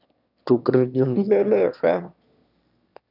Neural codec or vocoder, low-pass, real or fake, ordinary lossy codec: autoencoder, 22.05 kHz, a latent of 192 numbers a frame, VITS, trained on one speaker; 5.4 kHz; fake; none